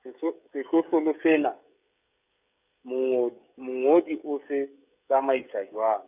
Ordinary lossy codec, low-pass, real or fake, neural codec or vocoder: none; 3.6 kHz; fake; codec, 16 kHz, 16 kbps, FreqCodec, smaller model